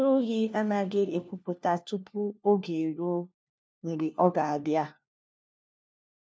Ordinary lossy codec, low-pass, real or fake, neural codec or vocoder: none; none; fake; codec, 16 kHz, 1 kbps, FunCodec, trained on LibriTTS, 50 frames a second